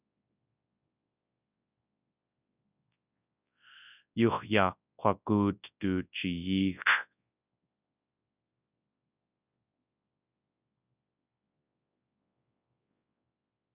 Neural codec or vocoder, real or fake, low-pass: codec, 24 kHz, 0.9 kbps, WavTokenizer, large speech release; fake; 3.6 kHz